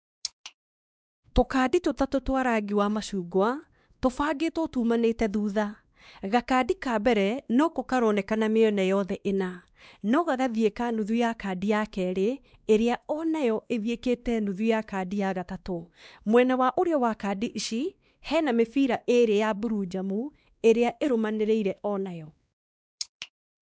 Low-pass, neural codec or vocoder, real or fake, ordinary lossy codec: none; codec, 16 kHz, 2 kbps, X-Codec, WavLM features, trained on Multilingual LibriSpeech; fake; none